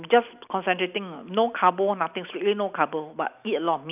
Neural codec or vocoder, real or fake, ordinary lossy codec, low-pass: none; real; none; 3.6 kHz